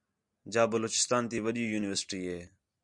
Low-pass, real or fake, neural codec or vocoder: 10.8 kHz; real; none